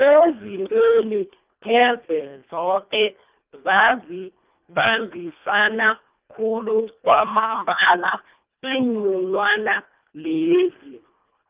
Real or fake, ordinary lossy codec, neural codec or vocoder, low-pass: fake; Opus, 24 kbps; codec, 24 kHz, 1.5 kbps, HILCodec; 3.6 kHz